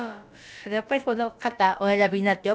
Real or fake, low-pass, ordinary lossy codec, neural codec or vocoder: fake; none; none; codec, 16 kHz, about 1 kbps, DyCAST, with the encoder's durations